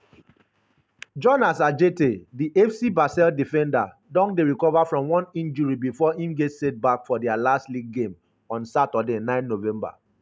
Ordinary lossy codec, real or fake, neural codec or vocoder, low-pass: none; real; none; none